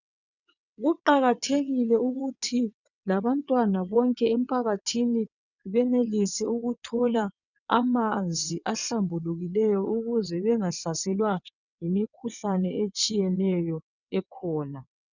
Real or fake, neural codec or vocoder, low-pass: fake; vocoder, 22.05 kHz, 80 mel bands, WaveNeXt; 7.2 kHz